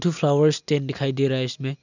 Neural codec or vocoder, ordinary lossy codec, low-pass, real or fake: none; none; 7.2 kHz; real